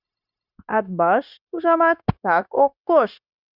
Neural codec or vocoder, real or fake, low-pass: codec, 16 kHz, 0.9 kbps, LongCat-Audio-Codec; fake; 5.4 kHz